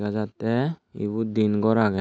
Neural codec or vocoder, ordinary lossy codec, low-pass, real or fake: none; none; none; real